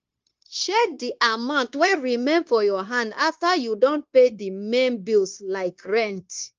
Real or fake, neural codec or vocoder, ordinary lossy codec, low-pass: fake; codec, 16 kHz, 0.9 kbps, LongCat-Audio-Codec; Opus, 24 kbps; 7.2 kHz